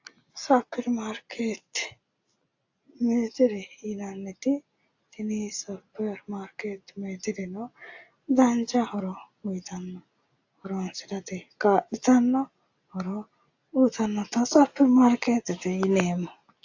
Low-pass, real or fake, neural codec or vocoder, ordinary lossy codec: 7.2 kHz; real; none; AAC, 48 kbps